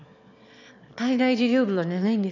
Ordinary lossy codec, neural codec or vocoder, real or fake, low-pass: none; autoencoder, 22.05 kHz, a latent of 192 numbers a frame, VITS, trained on one speaker; fake; 7.2 kHz